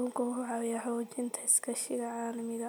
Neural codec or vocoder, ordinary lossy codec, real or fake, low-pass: none; none; real; none